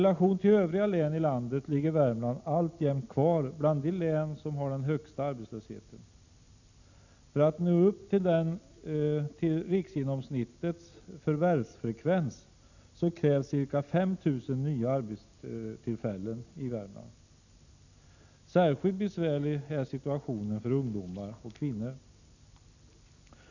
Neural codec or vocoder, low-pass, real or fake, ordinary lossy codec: none; 7.2 kHz; real; none